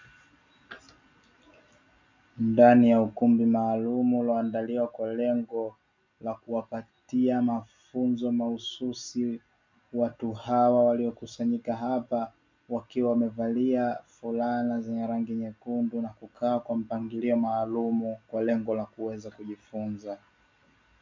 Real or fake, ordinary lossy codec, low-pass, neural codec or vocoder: real; MP3, 64 kbps; 7.2 kHz; none